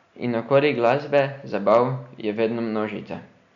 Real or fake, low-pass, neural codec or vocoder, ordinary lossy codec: real; 7.2 kHz; none; none